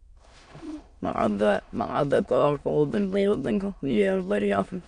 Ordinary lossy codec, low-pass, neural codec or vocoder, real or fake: none; 9.9 kHz; autoencoder, 22.05 kHz, a latent of 192 numbers a frame, VITS, trained on many speakers; fake